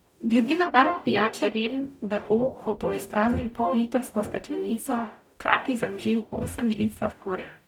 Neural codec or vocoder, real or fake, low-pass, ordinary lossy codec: codec, 44.1 kHz, 0.9 kbps, DAC; fake; 19.8 kHz; none